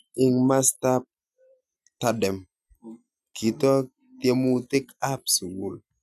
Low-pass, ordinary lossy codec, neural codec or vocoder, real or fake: 14.4 kHz; none; none; real